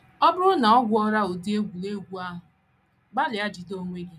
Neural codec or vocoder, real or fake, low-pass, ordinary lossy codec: none; real; 14.4 kHz; none